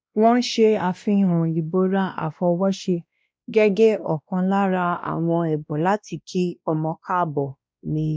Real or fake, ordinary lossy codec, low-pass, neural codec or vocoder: fake; none; none; codec, 16 kHz, 1 kbps, X-Codec, WavLM features, trained on Multilingual LibriSpeech